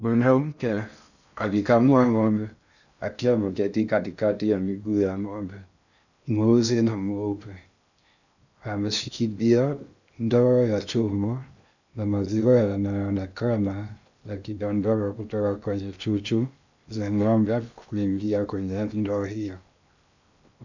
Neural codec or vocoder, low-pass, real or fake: codec, 16 kHz in and 24 kHz out, 0.6 kbps, FocalCodec, streaming, 4096 codes; 7.2 kHz; fake